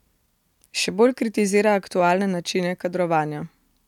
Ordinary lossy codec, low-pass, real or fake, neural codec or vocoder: none; 19.8 kHz; real; none